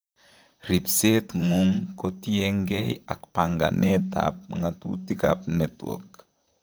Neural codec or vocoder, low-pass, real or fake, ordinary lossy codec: vocoder, 44.1 kHz, 128 mel bands, Pupu-Vocoder; none; fake; none